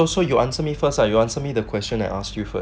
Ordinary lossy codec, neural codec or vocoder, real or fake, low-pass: none; none; real; none